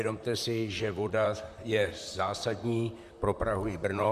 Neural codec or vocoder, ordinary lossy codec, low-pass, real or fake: vocoder, 44.1 kHz, 128 mel bands, Pupu-Vocoder; Opus, 64 kbps; 14.4 kHz; fake